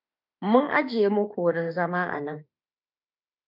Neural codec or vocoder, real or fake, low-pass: autoencoder, 48 kHz, 32 numbers a frame, DAC-VAE, trained on Japanese speech; fake; 5.4 kHz